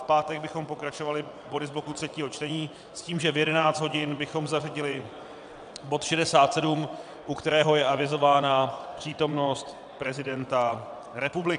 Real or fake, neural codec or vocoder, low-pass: fake; vocoder, 22.05 kHz, 80 mel bands, WaveNeXt; 9.9 kHz